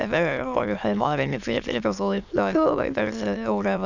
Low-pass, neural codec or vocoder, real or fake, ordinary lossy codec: 7.2 kHz; autoencoder, 22.05 kHz, a latent of 192 numbers a frame, VITS, trained on many speakers; fake; none